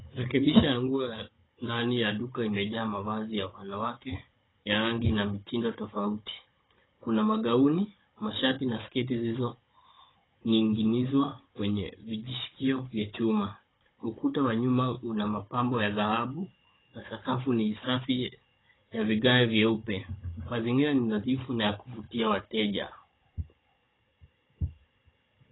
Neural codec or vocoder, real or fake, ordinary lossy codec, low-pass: codec, 16 kHz, 16 kbps, FunCodec, trained on Chinese and English, 50 frames a second; fake; AAC, 16 kbps; 7.2 kHz